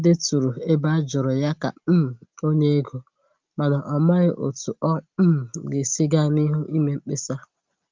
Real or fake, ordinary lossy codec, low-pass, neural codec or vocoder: real; Opus, 24 kbps; 7.2 kHz; none